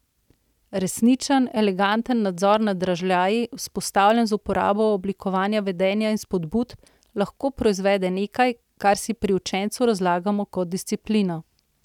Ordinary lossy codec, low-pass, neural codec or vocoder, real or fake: none; 19.8 kHz; none; real